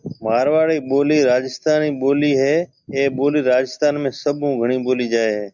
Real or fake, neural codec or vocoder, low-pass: real; none; 7.2 kHz